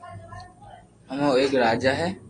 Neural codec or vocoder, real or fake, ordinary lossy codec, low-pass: none; real; AAC, 32 kbps; 9.9 kHz